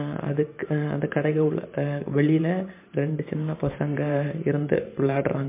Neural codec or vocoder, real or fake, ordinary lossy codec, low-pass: vocoder, 44.1 kHz, 128 mel bands every 512 samples, BigVGAN v2; fake; MP3, 24 kbps; 3.6 kHz